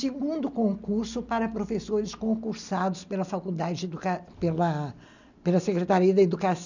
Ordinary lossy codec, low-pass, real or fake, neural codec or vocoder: none; 7.2 kHz; real; none